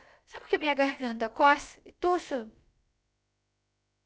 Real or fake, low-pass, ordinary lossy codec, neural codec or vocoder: fake; none; none; codec, 16 kHz, about 1 kbps, DyCAST, with the encoder's durations